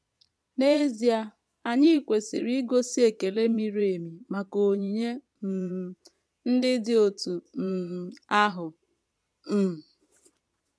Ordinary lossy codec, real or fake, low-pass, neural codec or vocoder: none; fake; none; vocoder, 22.05 kHz, 80 mel bands, Vocos